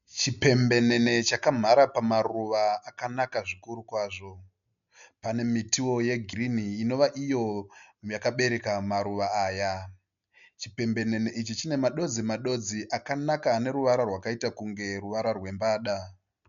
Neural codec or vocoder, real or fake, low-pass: none; real; 7.2 kHz